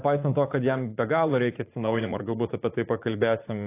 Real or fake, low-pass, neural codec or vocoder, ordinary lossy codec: fake; 3.6 kHz; vocoder, 44.1 kHz, 80 mel bands, Vocos; AAC, 24 kbps